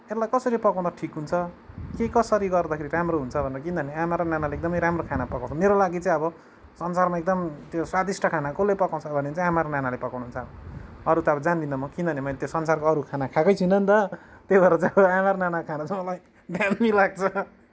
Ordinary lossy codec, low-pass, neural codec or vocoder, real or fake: none; none; none; real